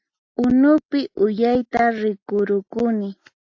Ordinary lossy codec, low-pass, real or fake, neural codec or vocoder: AAC, 48 kbps; 7.2 kHz; real; none